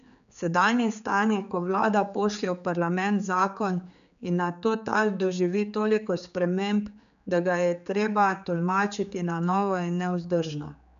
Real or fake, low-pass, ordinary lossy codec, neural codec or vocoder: fake; 7.2 kHz; none; codec, 16 kHz, 4 kbps, X-Codec, HuBERT features, trained on general audio